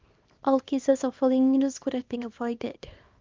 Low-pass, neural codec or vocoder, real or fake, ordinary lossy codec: 7.2 kHz; codec, 24 kHz, 0.9 kbps, WavTokenizer, small release; fake; Opus, 24 kbps